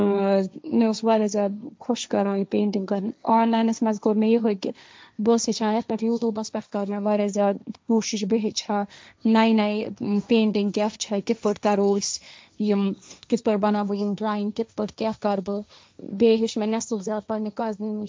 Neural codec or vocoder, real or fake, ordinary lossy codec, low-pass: codec, 16 kHz, 1.1 kbps, Voila-Tokenizer; fake; none; none